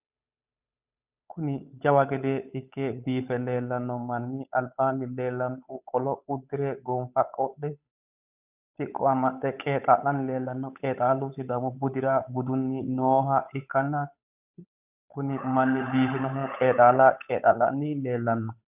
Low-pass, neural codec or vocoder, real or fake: 3.6 kHz; codec, 16 kHz, 8 kbps, FunCodec, trained on Chinese and English, 25 frames a second; fake